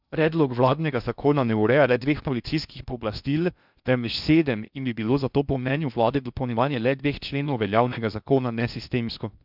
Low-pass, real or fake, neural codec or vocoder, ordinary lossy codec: 5.4 kHz; fake; codec, 16 kHz in and 24 kHz out, 0.6 kbps, FocalCodec, streaming, 2048 codes; none